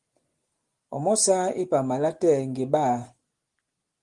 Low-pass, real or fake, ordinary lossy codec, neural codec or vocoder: 10.8 kHz; real; Opus, 24 kbps; none